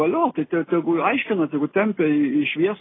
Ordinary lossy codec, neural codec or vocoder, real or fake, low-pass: AAC, 16 kbps; none; real; 7.2 kHz